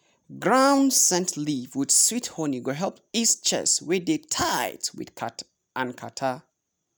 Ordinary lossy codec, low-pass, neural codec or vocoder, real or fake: none; none; none; real